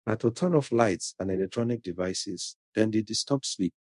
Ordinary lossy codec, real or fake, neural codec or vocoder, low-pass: MP3, 64 kbps; fake; codec, 24 kHz, 0.5 kbps, DualCodec; 10.8 kHz